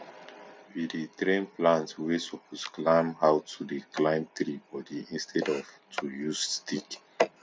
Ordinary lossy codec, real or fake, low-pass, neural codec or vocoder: none; real; none; none